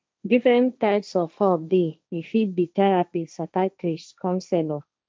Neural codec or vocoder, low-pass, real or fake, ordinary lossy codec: codec, 16 kHz, 1.1 kbps, Voila-Tokenizer; none; fake; none